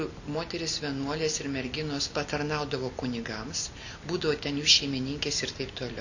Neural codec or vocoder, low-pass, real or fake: none; 7.2 kHz; real